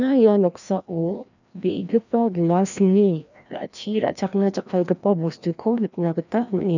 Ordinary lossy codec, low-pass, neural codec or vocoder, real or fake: none; 7.2 kHz; codec, 16 kHz, 1 kbps, FreqCodec, larger model; fake